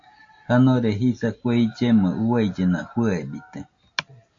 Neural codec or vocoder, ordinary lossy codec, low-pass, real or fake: none; AAC, 48 kbps; 7.2 kHz; real